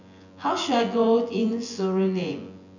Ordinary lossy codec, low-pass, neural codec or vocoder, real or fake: none; 7.2 kHz; vocoder, 24 kHz, 100 mel bands, Vocos; fake